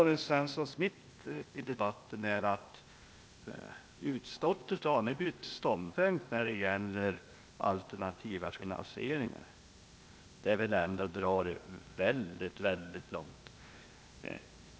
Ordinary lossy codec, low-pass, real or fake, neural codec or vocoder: none; none; fake; codec, 16 kHz, 0.8 kbps, ZipCodec